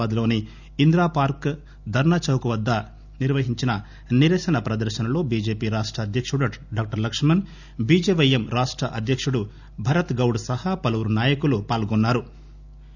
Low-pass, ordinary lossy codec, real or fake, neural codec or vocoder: 7.2 kHz; none; real; none